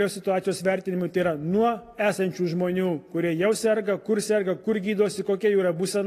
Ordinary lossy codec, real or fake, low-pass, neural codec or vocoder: AAC, 48 kbps; real; 14.4 kHz; none